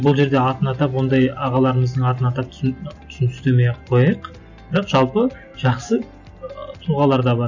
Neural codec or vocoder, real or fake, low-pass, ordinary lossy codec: none; real; 7.2 kHz; none